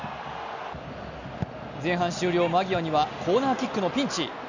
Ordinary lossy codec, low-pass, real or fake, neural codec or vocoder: none; 7.2 kHz; real; none